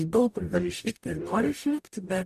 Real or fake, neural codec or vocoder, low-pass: fake; codec, 44.1 kHz, 0.9 kbps, DAC; 14.4 kHz